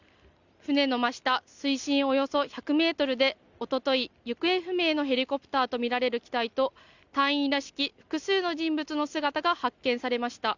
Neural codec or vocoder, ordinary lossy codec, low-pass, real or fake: none; Opus, 64 kbps; 7.2 kHz; real